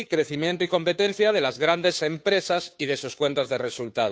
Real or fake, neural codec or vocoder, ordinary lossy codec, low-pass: fake; codec, 16 kHz, 2 kbps, FunCodec, trained on Chinese and English, 25 frames a second; none; none